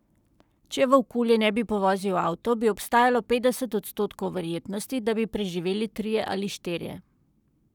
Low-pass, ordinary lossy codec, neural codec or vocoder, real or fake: 19.8 kHz; none; codec, 44.1 kHz, 7.8 kbps, Pupu-Codec; fake